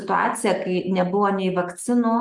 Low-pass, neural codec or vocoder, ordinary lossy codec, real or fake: 10.8 kHz; none; Opus, 32 kbps; real